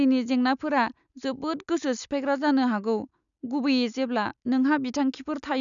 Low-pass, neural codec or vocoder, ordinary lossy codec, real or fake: 7.2 kHz; none; none; real